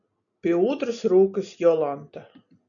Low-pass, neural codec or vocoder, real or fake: 7.2 kHz; none; real